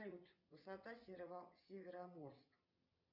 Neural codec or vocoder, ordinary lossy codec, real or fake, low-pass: vocoder, 22.05 kHz, 80 mel bands, WaveNeXt; Opus, 64 kbps; fake; 5.4 kHz